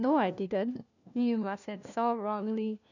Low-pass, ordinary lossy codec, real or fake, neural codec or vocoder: 7.2 kHz; none; fake; codec, 16 kHz, 1 kbps, FunCodec, trained on LibriTTS, 50 frames a second